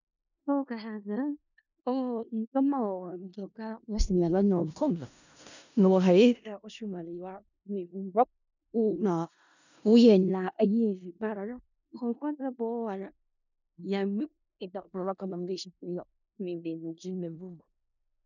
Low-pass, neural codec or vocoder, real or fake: 7.2 kHz; codec, 16 kHz in and 24 kHz out, 0.4 kbps, LongCat-Audio-Codec, four codebook decoder; fake